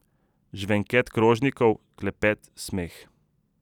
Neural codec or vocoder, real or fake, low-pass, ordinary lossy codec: none; real; 19.8 kHz; none